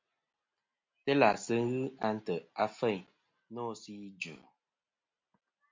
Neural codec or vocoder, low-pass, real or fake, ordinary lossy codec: none; 7.2 kHz; real; MP3, 64 kbps